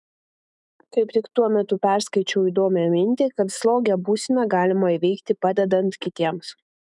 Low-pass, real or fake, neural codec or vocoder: 10.8 kHz; fake; autoencoder, 48 kHz, 128 numbers a frame, DAC-VAE, trained on Japanese speech